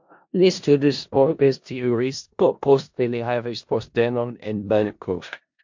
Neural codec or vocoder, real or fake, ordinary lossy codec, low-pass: codec, 16 kHz in and 24 kHz out, 0.4 kbps, LongCat-Audio-Codec, four codebook decoder; fake; MP3, 48 kbps; 7.2 kHz